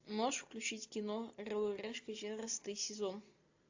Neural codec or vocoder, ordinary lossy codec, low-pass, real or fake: none; AAC, 48 kbps; 7.2 kHz; real